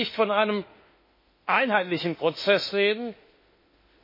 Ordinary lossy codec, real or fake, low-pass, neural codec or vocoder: MP3, 24 kbps; fake; 5.4 kHz; autoencoder, 48 kHz, 32 numbers a frame, DAC-VAE, trained on Japanese speech